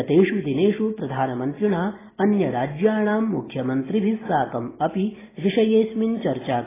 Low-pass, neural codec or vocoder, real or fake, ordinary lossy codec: 3.6 kHz; none; real; AAC, 16 kbps